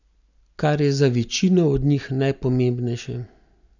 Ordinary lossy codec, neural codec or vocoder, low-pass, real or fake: none; none; 7.2 kHz; real